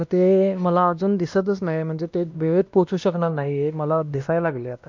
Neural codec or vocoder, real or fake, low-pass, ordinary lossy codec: codec, 16 kHz, 1 kbps, X-Codec, WavLM features, trained on Multilingual LibriSpeech; fake; 7.2 kHz; MP3, 64 kbps